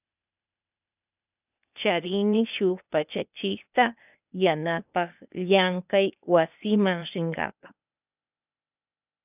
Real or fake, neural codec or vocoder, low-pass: fake; codec, 16 kHz, 0.8 kbps, ZipCodec; 3.6 kHz